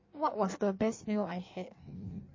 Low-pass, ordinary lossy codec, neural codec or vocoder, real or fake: 7.2 kHz; MP3, 32 kbps; codec, 16 kHz in and 24 kHz out, 1.1 kbps, FireRedTTS-2 codec; fake